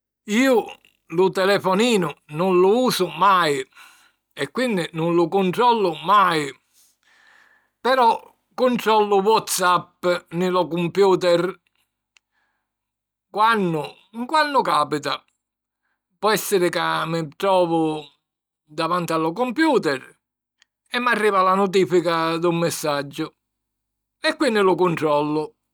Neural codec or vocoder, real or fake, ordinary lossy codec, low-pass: none; real; none; none